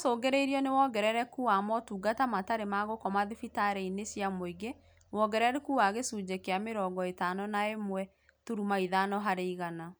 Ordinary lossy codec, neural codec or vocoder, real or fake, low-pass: none; none; real; none